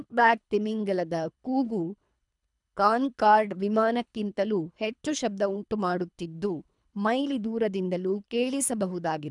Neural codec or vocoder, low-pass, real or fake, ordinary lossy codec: codec, 24 kHz, 3 kbps, HILCodec; 10.8 kHz; fake; none